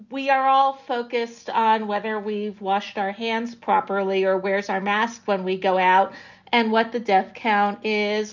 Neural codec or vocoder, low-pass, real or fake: none; 7.2 kHz; real